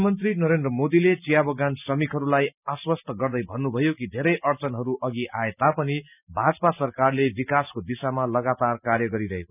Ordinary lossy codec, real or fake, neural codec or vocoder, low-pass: none; real; none; 3.6 kHz